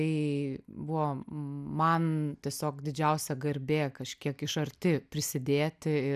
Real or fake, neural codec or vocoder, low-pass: real; none; 14.4 kHz